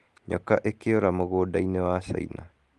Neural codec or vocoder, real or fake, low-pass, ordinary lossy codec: none; real; 10.8 kHz; Opus, 24 kbps